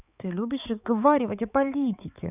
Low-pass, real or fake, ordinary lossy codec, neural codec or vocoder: 3.6 kHz; fake; none; codec, 16 kHz, 4 kbps, X-Codec, HuBERT features, trained on balanced general audio